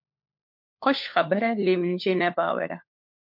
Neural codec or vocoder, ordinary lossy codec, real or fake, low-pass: codec, 16 kHz, 4 kbps, FunCodec, trained on LibriTTS, 50 frames a second; MP3, 48 kbps; fake; 5.4 kHz